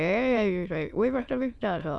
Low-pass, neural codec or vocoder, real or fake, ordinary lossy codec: none; autoencoder, 22.05 kHz, a latent of 192 numbers a frame, VITS, trained on many speakers; fake; none